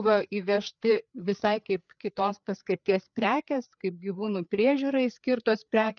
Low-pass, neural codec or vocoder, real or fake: 7.2 kHz; codec, 16 kHz, 4 kbps, FreqCodec, larger model; fake